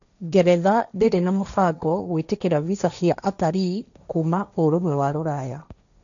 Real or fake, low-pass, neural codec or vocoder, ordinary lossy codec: fake; 7.2 kHz; codec, 16 kHz, 1.1 kbps, Voila-Tokenizer; none